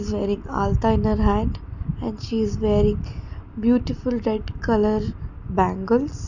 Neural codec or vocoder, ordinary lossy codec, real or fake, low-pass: none; none; real; 7.2 kHz